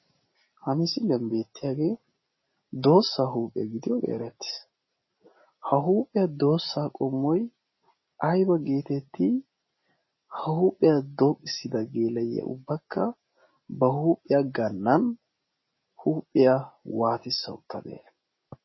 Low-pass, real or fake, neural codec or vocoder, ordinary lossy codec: 7.2 kHz; real; none; MP3, 24 kbps